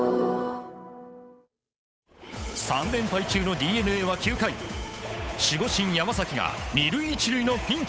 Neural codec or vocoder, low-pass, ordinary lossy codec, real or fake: codec, 16 kHz, 8 kbps, FunCodec, trained on Chinese and English, 25 frames a second; none; none; fake